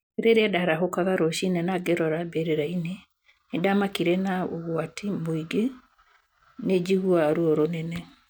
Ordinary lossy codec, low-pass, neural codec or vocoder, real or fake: none; none; none; real